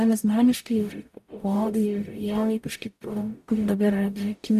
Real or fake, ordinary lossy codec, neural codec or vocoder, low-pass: fake; AAC, 96 kbps; codec, 44.1 kHz, 0.9 kbps, DAC; 14.4 kHz